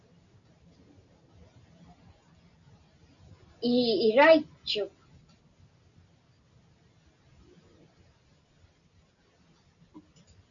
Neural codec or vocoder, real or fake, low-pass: none; real; 7.2 kHz